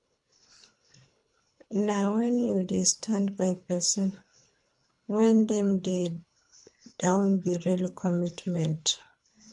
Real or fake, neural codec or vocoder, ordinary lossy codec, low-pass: fake; codec, 24 kHz, 3 kbps, HILCodec; MP3, 64 kbps; 10.8 kHz